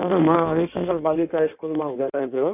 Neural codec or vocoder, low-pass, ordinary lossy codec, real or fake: codec, 16 kHz in and 24 kHz out, 1.1 kbps, FireRedTTS-2 codec; 3.6 kHz; none; fake